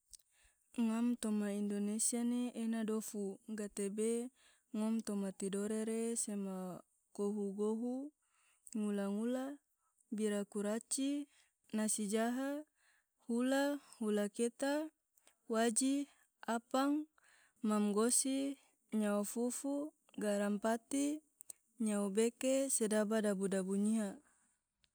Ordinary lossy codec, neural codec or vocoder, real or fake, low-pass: none; none; real; none